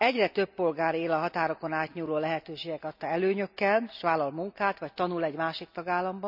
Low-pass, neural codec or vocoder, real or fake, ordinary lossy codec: 5.4 kHz; none; real; none